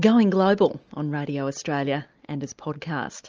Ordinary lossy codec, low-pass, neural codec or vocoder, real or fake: Opus, 24 kbps; 7.2 kHz; none; real